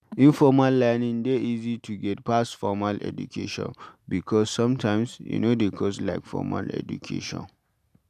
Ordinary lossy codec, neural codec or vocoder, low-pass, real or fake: none; none; 14.4 kHz; real